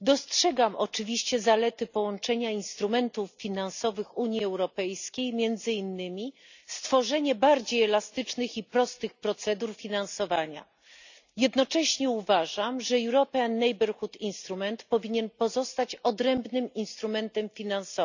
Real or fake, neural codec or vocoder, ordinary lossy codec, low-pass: real; none; none; 7.2 kHz